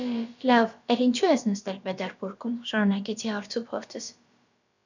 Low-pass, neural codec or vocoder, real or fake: 7.2 kHz; codec, 16 kHz, about 1 kbps, DyCAST, with the encoder's durations; fake